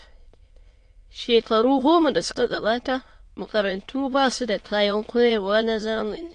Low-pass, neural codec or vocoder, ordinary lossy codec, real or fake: 9.9 kHz; autoencoder, 22.05 kHz, a latent of 192 numbers a frame, VITS, trained on many speakers; AAC, 48 kbps; fake